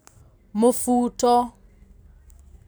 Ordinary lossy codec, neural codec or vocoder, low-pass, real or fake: none; none; none; real